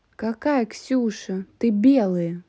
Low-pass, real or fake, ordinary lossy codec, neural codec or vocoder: none; real; none; none